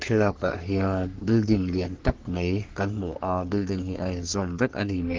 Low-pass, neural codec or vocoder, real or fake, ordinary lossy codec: 7.2 kHz; codec, 44.1 kHz, 3.4 kbps, Pupu-Codec; fake; Opus, 16 kbps